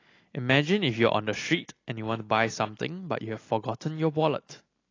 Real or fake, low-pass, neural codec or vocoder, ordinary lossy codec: real; 7.2 kHz; none; AAC, 32 kbps